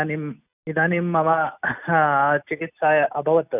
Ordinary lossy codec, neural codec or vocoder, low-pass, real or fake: none; none; 3.6 kHz; real